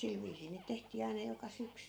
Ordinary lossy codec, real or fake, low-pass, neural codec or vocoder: none; real; none; none